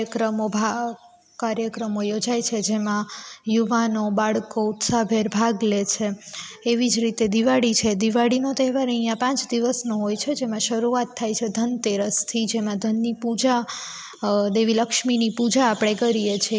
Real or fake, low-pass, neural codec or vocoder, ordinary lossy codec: real; none; none; none